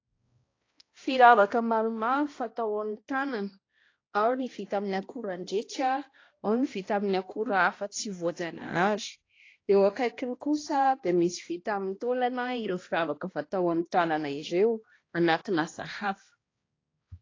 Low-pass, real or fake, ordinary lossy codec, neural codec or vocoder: 7.2 kHz; fake; AAC, 32 kbps; codec, 16 kHz, 1 kbps, X-Codec, HuBERT features, trained on balanced general audio